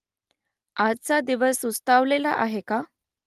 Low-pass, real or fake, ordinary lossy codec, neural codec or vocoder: 14.4 kHz; real; Opus, 32 kbps; none